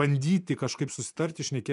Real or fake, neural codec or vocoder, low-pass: fake; vocoder, 24 kHz, 100 mel bands, Vocos; 10.8 kHz